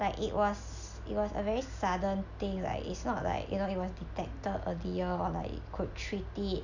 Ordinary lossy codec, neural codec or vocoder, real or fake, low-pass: none; none; real; 7.2 kHz